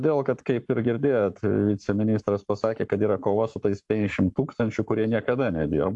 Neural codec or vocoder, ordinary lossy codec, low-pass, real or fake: codec, 44.1 kHz, 7.8 kbps, Pupu-Codec; AAC, 64 kbps; 10.8 kHz; fake